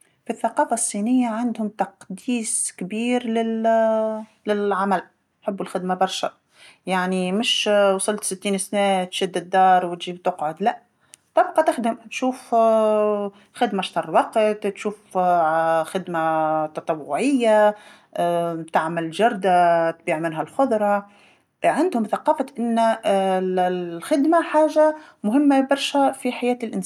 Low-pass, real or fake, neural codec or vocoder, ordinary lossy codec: 19.8 kHz; real; none; none